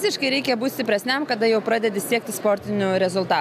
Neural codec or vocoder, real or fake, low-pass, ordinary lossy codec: none; real; 14.4 kHz; AAC, 96 kbps